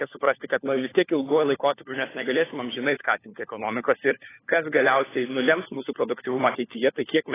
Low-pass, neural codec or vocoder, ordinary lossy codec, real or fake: 3.6 kHz; codec, 16 kHz, 4 kbps, FunCodec, trained on LibriTTS, 50 frames a second; AAC, 16 kbps; fake